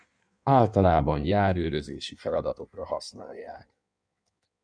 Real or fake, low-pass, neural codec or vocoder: fake; 9.9 kHz; codec, 16 kHz in and 24 kHz out, 1.1 kbps, FireRedTTS-2 codec